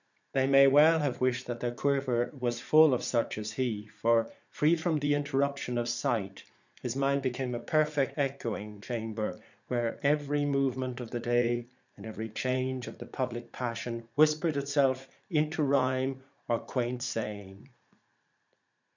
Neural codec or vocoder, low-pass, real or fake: vocoder, 44.1 kHz, 80 mel bands, Vocos; 7.2 kHz; fake